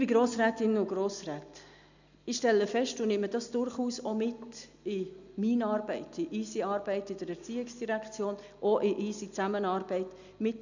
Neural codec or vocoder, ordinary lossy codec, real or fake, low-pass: none; none; real; 7.2 kHz